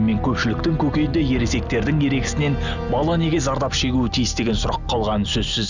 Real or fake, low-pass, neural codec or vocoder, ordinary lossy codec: real; 7.2 kHz; none; none